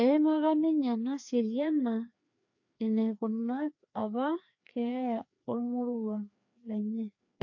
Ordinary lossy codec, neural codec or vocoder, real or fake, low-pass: AAC, 48 kbps; codec, 16 kHz, 2 kbps, FreqCodec, larger model; fake; 7.2 kHz